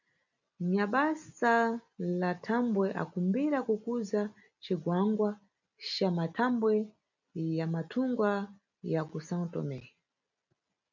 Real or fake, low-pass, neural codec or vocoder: real; 7.2 kHz; none